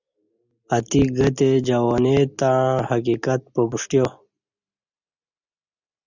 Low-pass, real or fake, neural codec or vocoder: 7.2 kHz; real; none